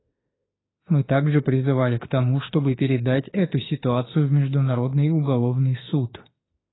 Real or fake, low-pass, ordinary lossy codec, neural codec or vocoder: fake; 7.2 kHz; AAC, 16 kbps; autoencoder, 48 kHz, 32 numbers a frame, DAC-VAE, trained on Japanese speech